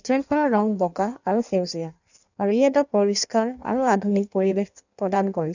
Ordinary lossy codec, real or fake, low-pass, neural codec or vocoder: none; fake; 7.2 kHz; codec, 16 kHz in and 24 kHz out, 1.1 kbps, FireRedTTS-2 codec